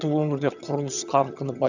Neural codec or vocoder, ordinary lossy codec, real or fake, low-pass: vocoder, 22.05 kHz, 80 mel bands, HiFi-GAN; none; fake; 7.2 kHz